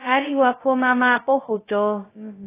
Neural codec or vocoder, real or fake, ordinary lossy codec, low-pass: codec, 16 kHz, 0.2 kbps, FocalCodec; fake; AAC, 16 kbps; 3.6 kHz